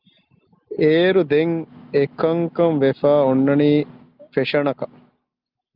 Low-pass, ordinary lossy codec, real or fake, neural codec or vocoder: 5.4 kHz; Opus, 24 kbps; real; none